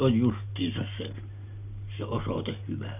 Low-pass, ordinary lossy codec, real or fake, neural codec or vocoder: 3.6 kHz; none; real; none